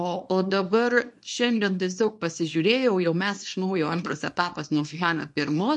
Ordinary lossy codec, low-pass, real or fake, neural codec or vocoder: MP3, 48 kbps; 10.8 kHz; fake; codec, 24 kHz, 0.9 kbps, WavTokenizer, small release